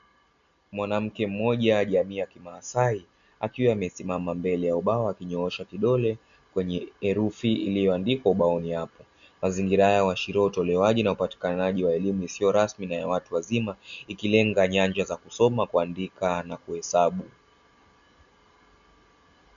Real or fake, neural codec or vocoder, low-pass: real; none; 7.2 kHz